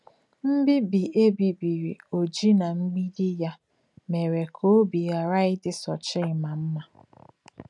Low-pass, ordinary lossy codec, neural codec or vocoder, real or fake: 10.8 kHz; none; none; real